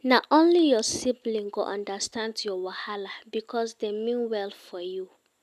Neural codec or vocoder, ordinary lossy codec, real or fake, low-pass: none; none; real; 14.4 kHz